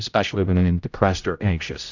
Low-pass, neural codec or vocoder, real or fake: 7.2 kHz; codec, 16 kHz, 0.5 kbps, X-Codec, HuBERT features, trained on general audio; fake